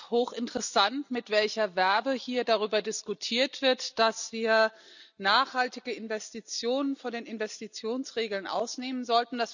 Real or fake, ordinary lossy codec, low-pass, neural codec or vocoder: real; none; 7.2 kHz; none